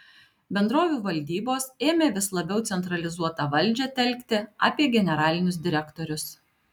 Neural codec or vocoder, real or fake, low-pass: none; real; 19.8 kHz